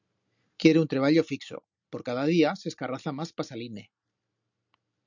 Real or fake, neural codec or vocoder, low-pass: real; none; 7.2 kHz